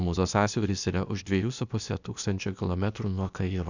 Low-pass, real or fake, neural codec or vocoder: 7.2 kHz; fake; codec, 16 kHz, 0.8 kbps, ZipCodec